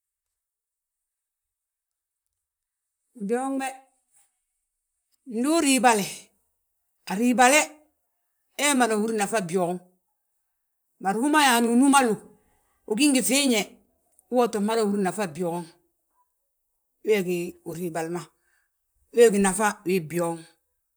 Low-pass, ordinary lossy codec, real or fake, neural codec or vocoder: none; none; fake; vocoder, 44.1 kHz, 128 mel bands, Pupu-Vocoder